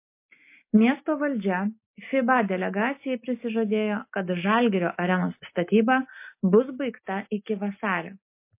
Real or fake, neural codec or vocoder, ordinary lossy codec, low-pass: real; none; MP3, 24 kbps; 3.6 kHz